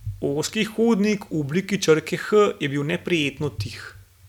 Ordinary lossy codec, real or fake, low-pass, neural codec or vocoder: none; real; 19.8 kHz; none